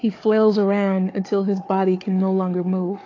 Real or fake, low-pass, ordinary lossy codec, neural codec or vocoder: fake; 7.2 kHz; AAC, 32 kbps; codec, 16 kHz, 4 kbps, FunCodec, trained on Chinese and English, 50 frames a second